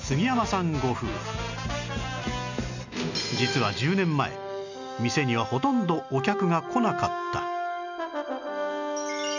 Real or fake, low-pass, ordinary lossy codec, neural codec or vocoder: real; 7.2 kHz; none; none